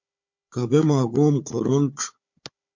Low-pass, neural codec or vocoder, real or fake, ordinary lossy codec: 7.2 kHz; codec, 16 kHz, 4 kbps, FunCodec, trained on Chinese and English, 50 frames a second; fake; MP3, 48 kbps